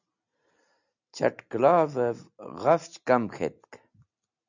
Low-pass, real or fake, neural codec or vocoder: 7.2 kHz; real; none